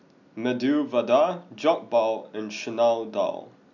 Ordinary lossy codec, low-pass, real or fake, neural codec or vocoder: none; 7.2 kHz; real; none